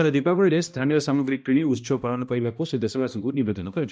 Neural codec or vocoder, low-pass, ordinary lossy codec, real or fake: codec, 16 kHz, 1 kbps, X-Codec, HuBERT features, trained on balanced general audio; none; none; fake